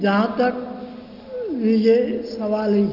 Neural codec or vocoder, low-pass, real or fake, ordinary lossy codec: none; 5.4 kHz; real; Opus, 32 kbps